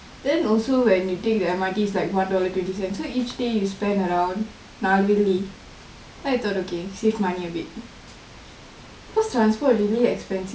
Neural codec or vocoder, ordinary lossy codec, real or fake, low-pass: none; none; real; none